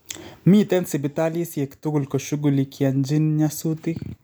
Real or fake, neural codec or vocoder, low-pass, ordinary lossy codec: real; none; none; none